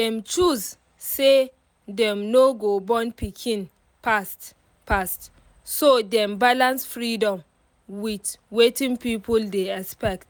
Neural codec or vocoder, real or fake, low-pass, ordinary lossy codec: none; real; none; none